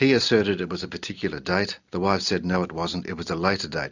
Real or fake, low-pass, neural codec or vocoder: real; 7.2 kHz; none